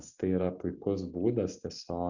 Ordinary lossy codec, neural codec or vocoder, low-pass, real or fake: Opus, 64 kbps; none; 7.2 kHz; real